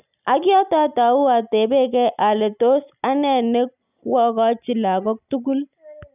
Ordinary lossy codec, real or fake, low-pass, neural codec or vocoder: none; real; 3.6 kHz; none